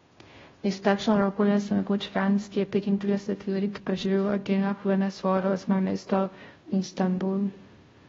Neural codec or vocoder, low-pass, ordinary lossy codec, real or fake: codec, 16 kHz, 0.5 kbps, FunCodec, trained on Chinese and English, 25 frames a second; 7.2 kHz; AAC, 24 kbps; fake